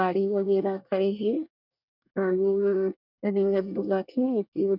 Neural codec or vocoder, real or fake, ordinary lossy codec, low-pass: codec, 24 kHz, 1 kbps, SNAC; fake; none; 5.4 kHz